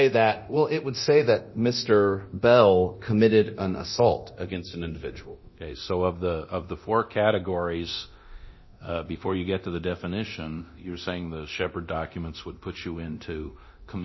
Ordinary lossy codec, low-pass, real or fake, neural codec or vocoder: MP3, 24 kbps; 7.2 kHz; fake; codec, 24 kHz, 0.9 kbps, DualCodec